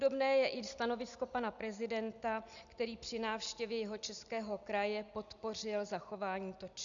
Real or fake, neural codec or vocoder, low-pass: real; none; 7.2 kHz